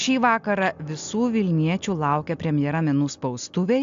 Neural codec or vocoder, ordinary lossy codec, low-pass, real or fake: none; AAC, 64 kbps; 7.2 kHz; real